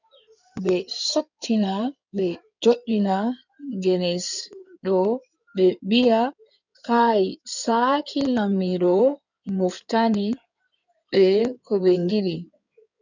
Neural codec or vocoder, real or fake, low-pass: codec, 16 kHz in and 24 kHz out, 2.2 kbps, FireRedTTS-2 codec; fake; 7.2 kHz